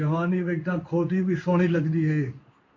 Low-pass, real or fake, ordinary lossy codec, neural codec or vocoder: 7.2 kHz; fake; MP3, 48 kbps; codec, 16 kHz in and 24 kHz out, 1 kbps, XY-Tokenizer